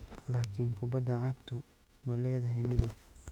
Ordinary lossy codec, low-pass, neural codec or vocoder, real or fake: none; 19.8 kHz; autoencoder, 48 kHz, 32 numbers a frame, DAC-VAE, trained on Japanese speech; fake